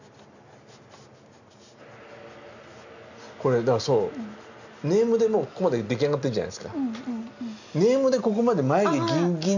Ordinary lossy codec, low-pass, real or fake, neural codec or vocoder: none; 7.2 kHz; real; none